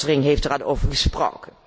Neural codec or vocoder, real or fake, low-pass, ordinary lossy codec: none; real; none; none